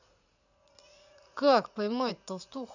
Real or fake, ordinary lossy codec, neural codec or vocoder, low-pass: fake; none; vocoder, 44.1 kHz, 80 mel bands, Vocos; 7.2 kHz